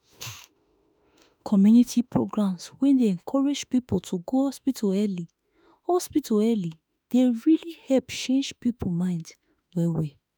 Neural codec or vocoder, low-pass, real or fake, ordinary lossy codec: autoencoder, 48 kHz, 32 numbers a frame, DAC-VAE, trained on Japanese speech; none; fake; none